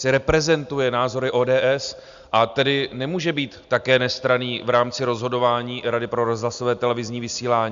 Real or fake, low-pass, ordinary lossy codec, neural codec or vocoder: real; 7.2 kHz; Opus, 64 kbps; none